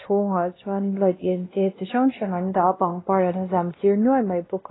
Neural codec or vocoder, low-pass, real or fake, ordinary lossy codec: codec, 16 kHz, 1 kbps, X-Codec, WavLM features, trained on Multilingual LibriSpeech; 7.2 kHz; fake; AAC, 16 kbps